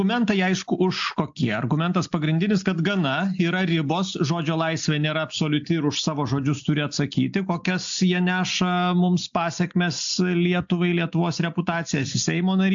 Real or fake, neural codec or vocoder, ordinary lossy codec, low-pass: real; none; AAC, 64 kbps; 7.2 kHz